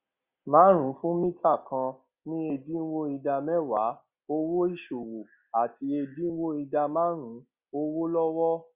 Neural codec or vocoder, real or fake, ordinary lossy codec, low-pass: none; real; Opus, 64 kbps; 3.6 kHz